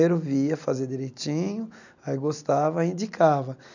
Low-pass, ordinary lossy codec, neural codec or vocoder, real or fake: 7.2 kHz; none; none; real